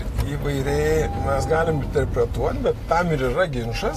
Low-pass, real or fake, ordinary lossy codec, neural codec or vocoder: 14.4 kHz; real; AAC, 48 kbps; none